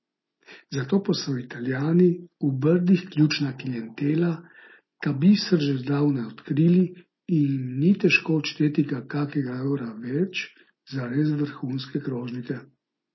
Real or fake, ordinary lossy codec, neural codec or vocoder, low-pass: real; MP3, 24 kbps; none; 7.2 kHz